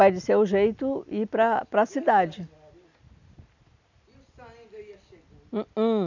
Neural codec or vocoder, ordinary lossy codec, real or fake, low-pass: none; none; real; 7.2 kHz